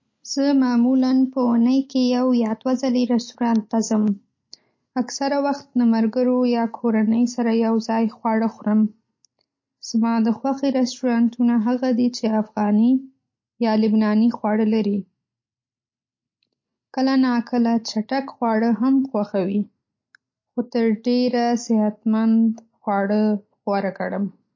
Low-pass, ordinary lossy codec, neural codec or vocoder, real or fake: 7.2 kHz; MP3, 32 kbps; none; real